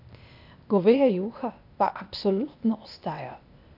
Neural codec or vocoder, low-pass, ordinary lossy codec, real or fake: codec, 16 kHz, 0.8 kbps, ZipCodec; 5.4 kHz; none; fake